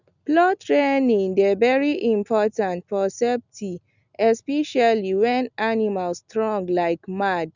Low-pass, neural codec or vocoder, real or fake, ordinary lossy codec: 7.2 kHz; none; real; none